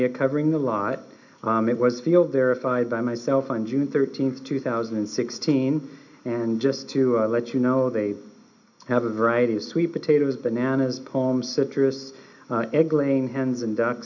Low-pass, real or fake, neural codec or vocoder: 7.2 kHz; real; none